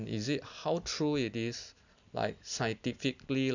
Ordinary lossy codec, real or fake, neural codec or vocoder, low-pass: none; real; none; 7.2 kHz